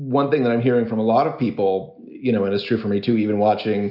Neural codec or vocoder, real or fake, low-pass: none; real; 5.4 kHz